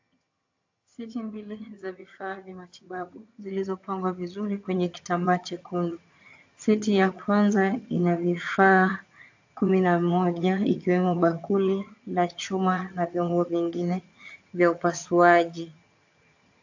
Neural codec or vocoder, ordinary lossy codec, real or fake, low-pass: vocoder, 22.05 kHz, 80 mel bands, HiFi-GAN; AAC, 48 kbps; fake; 7.2 kHz